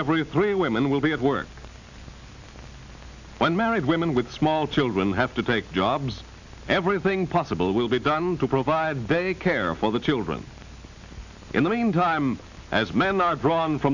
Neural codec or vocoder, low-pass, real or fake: none; 7.2 kHz; real